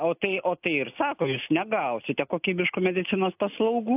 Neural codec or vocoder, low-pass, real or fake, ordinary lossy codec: none; 3.6 kHz; real; AAC, 32 kbps